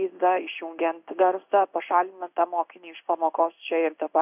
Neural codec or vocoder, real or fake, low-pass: codec, 16 kHz in and 24 kHz out, 1 kbps, XY-Tokenizer; fake; 3.6 kHz